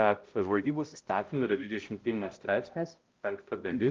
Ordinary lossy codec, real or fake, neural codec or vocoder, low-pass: Opus, 32 kbps; fake; codec, 16 kHz, 0.5 kbps, X-Codec, HuBERT features, trained on balanced general audio; 7.2 kHz